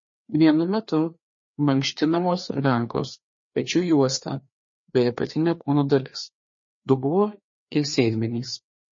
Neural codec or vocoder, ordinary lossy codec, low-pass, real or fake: codec, 16 kHz, 2 kbps, FreqCodec, larger model; MP3, 32 kbps; 7.2 kHz; fake